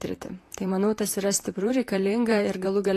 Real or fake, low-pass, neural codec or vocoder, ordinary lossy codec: fake; 14.4 kHz; vocoder, 44.1 kHz, 128 mel bands, Pupu-Vocoder; AAC, 48 kbps